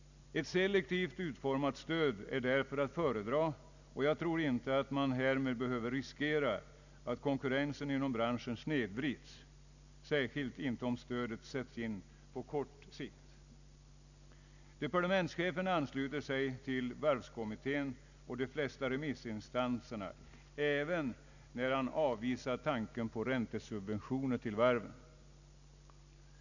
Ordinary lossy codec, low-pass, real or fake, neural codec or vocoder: none; 7.2 kHz; real; none